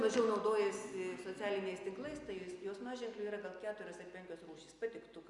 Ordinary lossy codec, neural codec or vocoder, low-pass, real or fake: Opus, 64 kbps; none; 10.8 kHz; real